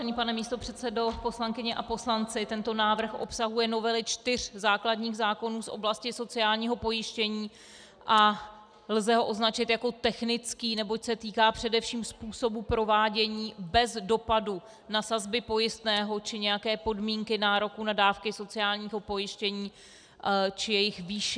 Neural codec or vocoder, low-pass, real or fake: none; 9.9 kHz; real